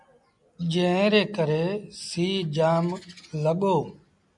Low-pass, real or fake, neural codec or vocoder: 10.8 kHz; real; none